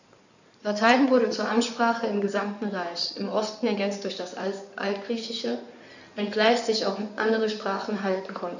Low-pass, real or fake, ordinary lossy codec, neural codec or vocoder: 7.2 kHz; fake; none; codec, 16 kHz in and 24 kHz out, 2.2 kbps, FireRedTTS-2 codec